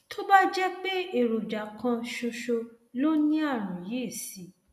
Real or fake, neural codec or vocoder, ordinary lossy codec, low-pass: real; none; none; 14.4 kHz